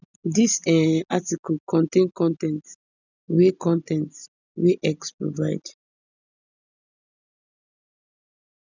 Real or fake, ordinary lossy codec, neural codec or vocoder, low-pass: real; none; none; 7.2 kHz